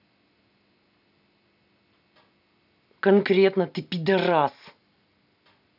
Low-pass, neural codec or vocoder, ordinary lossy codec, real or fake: 5.4 kHz; none; none; real